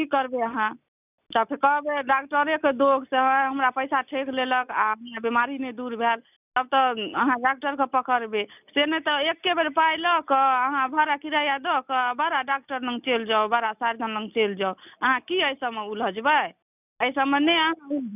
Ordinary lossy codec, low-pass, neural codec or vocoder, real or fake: none; 3.6 kHz; none; real